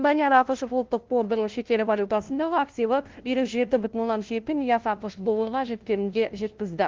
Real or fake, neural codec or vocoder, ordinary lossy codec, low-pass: fake; codec, 16 kHz, 0.5 kbps, FunCodec, trained on LibriTTS, 25 frames a second; Opus, 16 kbps; 7.2 kHz